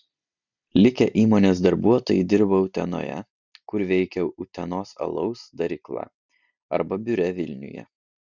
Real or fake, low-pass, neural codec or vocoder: real; 7.2 kHz; none